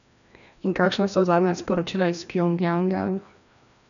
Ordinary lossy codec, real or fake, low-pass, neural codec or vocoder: none; fake; 7.2 kHz; codec, 16 kHz, 1 kbps, FreqCodec, larger model